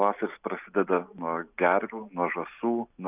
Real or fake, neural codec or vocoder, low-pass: real; none; 3.6 kHz